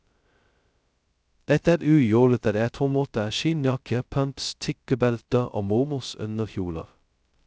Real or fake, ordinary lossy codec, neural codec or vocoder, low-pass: fake; none; codec, 16 kHz, 0.2 kbps, FocalCodec; none